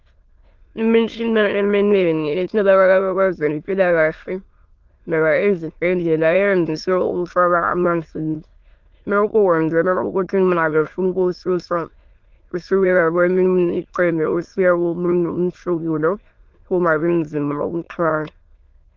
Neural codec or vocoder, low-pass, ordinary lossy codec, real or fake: autoencoder, 22.05 kHz, a latent of 192 numbers a frame, VITS, trained on many speakers; 7.2 kHz; Opus, 32 kbps; fake